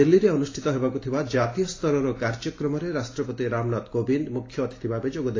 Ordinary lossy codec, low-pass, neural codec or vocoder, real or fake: AAC, 32 kbps; 7.2 kHz; none; real